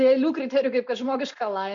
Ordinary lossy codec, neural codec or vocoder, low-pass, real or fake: MP3, 48 kbps; none; 7.2 kHz; real